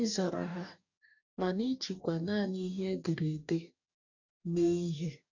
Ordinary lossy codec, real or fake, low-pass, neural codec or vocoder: none; fake; 7.2 kHz; codec, 44.1 kHz, 2.6 kbps, DAC